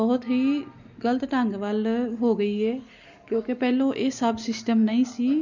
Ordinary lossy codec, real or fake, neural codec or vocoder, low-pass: none; real; none; 7.2 kHz